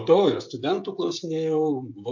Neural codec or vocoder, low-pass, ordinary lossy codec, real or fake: codec, 16 kHz, 16 kbps, FreqCodec, smaller model; 7.2 kHz; MP3, 48 kbps; fake